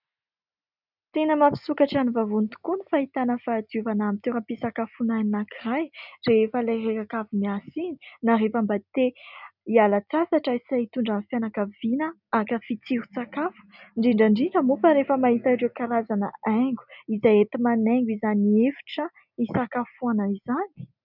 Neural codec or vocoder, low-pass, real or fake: none; 5.4 kHz; real